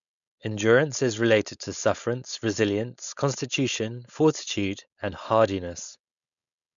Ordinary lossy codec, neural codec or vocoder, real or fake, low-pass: none; codec, 16 kHz, 4.8 kbps, FACodec; fake; 7.2 kHz